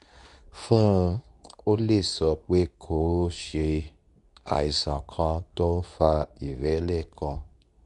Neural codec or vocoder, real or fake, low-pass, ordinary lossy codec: codec, 24 kHz, 0.9 kbps, WavTokenizer, medium speech release version 2; fake; 10.8 kHz; none